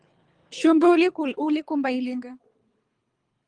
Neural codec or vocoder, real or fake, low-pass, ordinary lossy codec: codec, 24 kHz, 3 kbps, HILCodec; fake; 9.9 kHz; Opus, 24 kbps